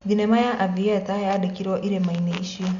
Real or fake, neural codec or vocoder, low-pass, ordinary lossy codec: real; none; 7.2 kHz; none